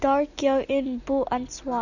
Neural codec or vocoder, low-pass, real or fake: none; 7.2 kHz; real